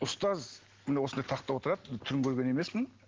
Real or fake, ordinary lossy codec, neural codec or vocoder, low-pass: real; Opus, 16 kbps; none; 7.2 kHz